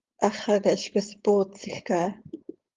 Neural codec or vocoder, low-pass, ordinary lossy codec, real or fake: codec, 16 kHz, 4.8 kbps, FACodec; 7.2 kHz; Opus, 32 kbps; fake